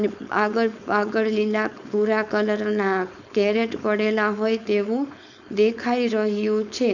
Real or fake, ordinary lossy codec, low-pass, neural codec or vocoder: fake; none; 7.2 kHz; codec, 16 kHz, 4.8 kbps, FACodec